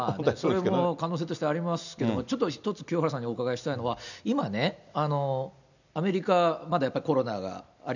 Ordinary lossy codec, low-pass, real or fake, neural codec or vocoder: none; 7.2 kHz; real; none